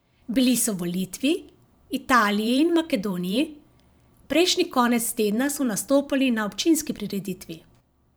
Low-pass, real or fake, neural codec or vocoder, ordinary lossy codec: none; fake; vocoder, 44.1 kHz, 128 mel bands every 512 samples, BigVGAN v2; none